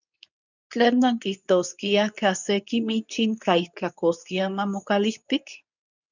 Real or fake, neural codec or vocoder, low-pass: fake; codec, 24 kHz, 0.9 kbps, WavTokenizer, medium speech release version 2; 7.2 kHz